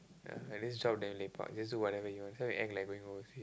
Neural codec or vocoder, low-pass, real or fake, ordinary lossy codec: none; none; real; none